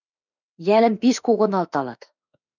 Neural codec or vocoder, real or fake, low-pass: autoencoder, 48 kHz, 32 numbers a frame, DAC-VAE, trained on Japanese speech; fake; 7.2 kHz